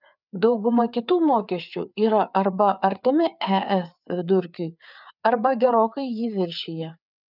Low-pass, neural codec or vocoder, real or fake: 5.4 kHz; codec, 16 kHz, 4 kbps, FreqCodec, larger model; fake